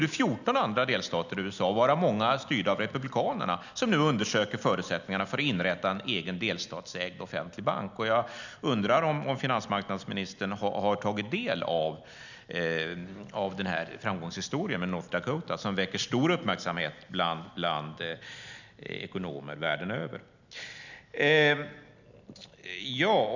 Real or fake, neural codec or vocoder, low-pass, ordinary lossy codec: real; none; 7.2 kHz; none